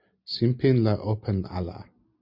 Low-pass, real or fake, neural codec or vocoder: 5.4 kHz; real; none